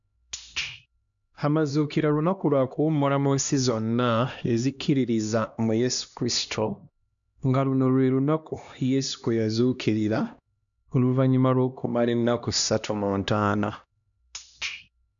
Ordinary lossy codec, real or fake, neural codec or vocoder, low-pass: none; fake; codec, 16 kHz, 1 kbps, X-Codec, HuBERT features, trained on LibriSpeech; 7.2 kHz